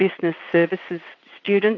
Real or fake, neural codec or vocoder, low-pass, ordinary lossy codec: fake; vocoder, 22.05 kHz, 80 mel bands, WaveNeXt; 7.2 kHz; MP3, 64 kbps